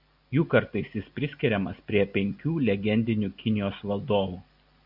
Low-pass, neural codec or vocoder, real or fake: 5.4 kHz; none; real